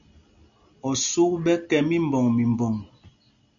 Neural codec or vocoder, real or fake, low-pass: none; real; 7.2 kHz